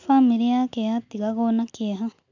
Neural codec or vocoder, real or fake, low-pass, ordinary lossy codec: none; real; 7.2 kHz; none